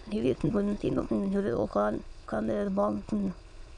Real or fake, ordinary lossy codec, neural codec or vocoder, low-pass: fake; none; autoencoder, 22.05 kHz, a latent of 192 numbers a frame, VITS, trained on many speakers; 9.9 kHz